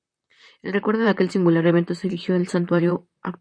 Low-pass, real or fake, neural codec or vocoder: 9.9 kHz; fake; vocoder, 44.1 kHz, 128 mel bands, Pupu-Vocoder